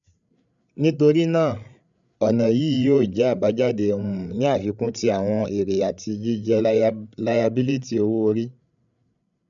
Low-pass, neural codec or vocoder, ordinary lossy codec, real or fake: 7.2 kHz; codec, 16 kHz, 8 kbps, FreqCodec, larger model; none; fake